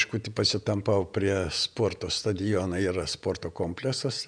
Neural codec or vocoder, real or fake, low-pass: vocoder, 48 kHz, 128 mel bands, Vocos; fake; 9.9 kHz